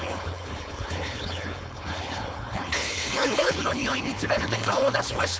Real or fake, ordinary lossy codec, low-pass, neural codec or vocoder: fake; none; none; codec, 16 kHz, 4.8 kbps, FACodec